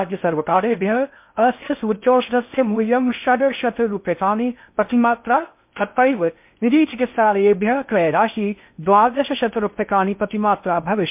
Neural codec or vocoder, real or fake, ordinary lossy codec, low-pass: codec, 16 kHz in and 24 kHz out, 0.6 kbps, FocalCodec, streaming, 4096 codes; fake; MP3, 32 kbps; 3.6 kHz